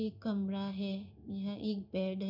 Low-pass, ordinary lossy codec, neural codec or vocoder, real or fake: 5.4 kHz; none; codec, 16 kHz in and 24 kHz out, 1 kbps, XY-Tokenizer; fake